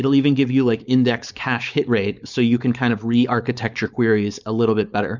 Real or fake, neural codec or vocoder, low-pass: fake; codec, 16 kHz, 4.8 kbps, FACodec; 7.2 kHz